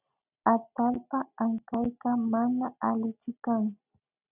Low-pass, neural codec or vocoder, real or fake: 3.6 kHz; none; real